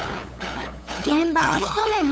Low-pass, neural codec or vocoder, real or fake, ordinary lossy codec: none; codec, 16 kHz, 8 kbps, FunCodec, trained on LibriTTS, 25 frames a second; fake; none